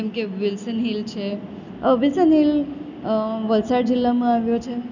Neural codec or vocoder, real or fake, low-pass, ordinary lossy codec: none; real; 7.2 kHz; none